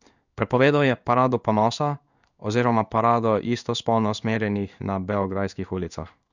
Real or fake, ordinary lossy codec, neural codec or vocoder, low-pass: fake; none; codec, 16 kHz in and 24 kHz out, 1 kbps, XY-Tokenizer; 7.2 kHz